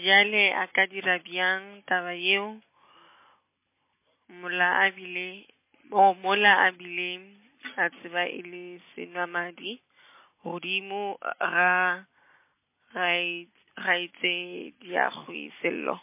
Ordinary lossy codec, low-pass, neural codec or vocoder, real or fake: MP3, 24 kbps; 3.6 kHz; none; real